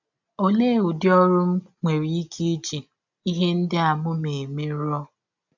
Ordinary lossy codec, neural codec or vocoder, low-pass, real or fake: none; none; 7.2 kHz; real